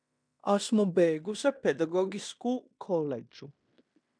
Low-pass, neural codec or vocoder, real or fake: 9.9 kHz; codec, 16 kHz in and 24 kHz out, 0.9 kbps, LongCat-Audio-Codec, fine tuned four codebook decoder; fake